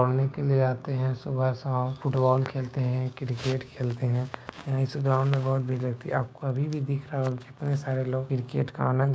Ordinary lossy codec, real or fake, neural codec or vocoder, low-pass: none; fake; codec, 16 kHz, 6 kbps, DAC; none